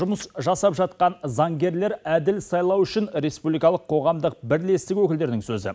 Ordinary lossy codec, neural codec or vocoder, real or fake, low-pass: none; none; real; none